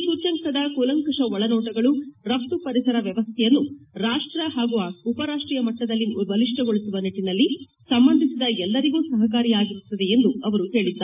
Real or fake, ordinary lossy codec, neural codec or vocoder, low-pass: real; none; none; 3.6 kHz